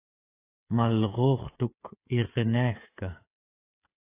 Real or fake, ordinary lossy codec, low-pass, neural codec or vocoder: fake; AAC, 24 kbps; 3.6 kHz; codec, 16 kHz, 16 kbps, FreqCodec, smaller model